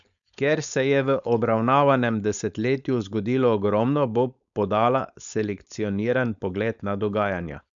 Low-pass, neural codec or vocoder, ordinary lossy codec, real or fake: 7.2 kHz; codec, 16 kHz, 4.8 kbps, FACodec; none; fake